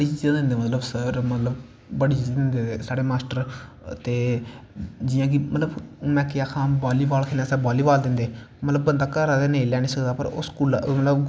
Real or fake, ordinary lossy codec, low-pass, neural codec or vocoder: real; none; none; none